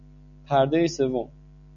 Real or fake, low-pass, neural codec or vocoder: real; 7.2 kHz; none